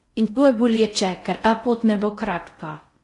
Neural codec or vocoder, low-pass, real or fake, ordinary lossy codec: codec, 16 kHz in and 24 kHz out, 0.6 kbps, FocalCodec, streaming, 4096 codes; 10.8 kHz; fake; AAC, 48 kbps